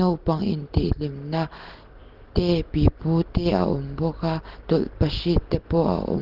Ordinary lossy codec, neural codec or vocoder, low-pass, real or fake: Opus, 16 kbps; none; 5.4 kHz; real